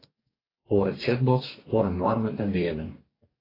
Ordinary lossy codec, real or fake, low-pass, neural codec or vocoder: AAC, 24 kbps; fake; 5.4 kHz; codec, 44.1 kHz, 2.6 kbps, DAC